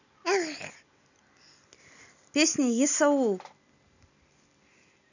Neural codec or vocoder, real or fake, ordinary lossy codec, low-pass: none; real; none; 7.2 kHz